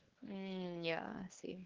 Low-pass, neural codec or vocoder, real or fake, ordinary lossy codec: 7.2 kHz; codec, 16 kHz, 2 kbps, X-Codec, WavLM features, trained on Multilingual LibriSpeech; fake; Opus, 16 kbps